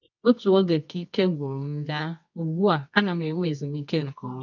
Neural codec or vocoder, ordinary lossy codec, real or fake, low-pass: codec, 24 kHz, 0.9 kbps, WavTokenizer, medium music audio release; none; fake; 7.2 kHz